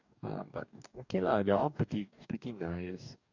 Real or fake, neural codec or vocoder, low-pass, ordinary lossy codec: fake; codec, 44.1 kHz, 2.6 kbps, DAC; 7.2 kHz; none